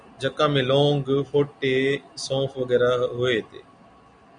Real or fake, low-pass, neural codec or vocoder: real; 9.9 kHz; none